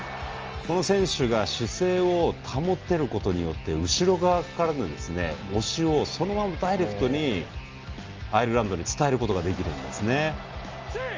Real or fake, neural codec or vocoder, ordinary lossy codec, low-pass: real; none; Opus, 24 kbps; 7.2 kHz